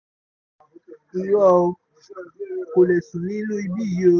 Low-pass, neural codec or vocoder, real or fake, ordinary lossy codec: 7.2 kHz; none; real; none